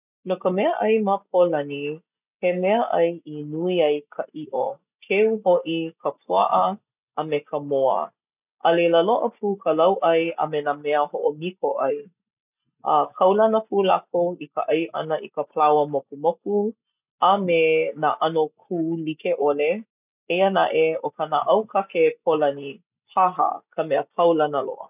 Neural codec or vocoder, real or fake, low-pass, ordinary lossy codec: none; real; 3.6 kHz; none